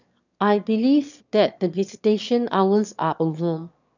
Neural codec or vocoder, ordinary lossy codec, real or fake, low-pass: autoencoder, 22.05 kHz, a latent of 192 numbers a frame, VITS, trained on one speaker; none; fake; 7.2 kHz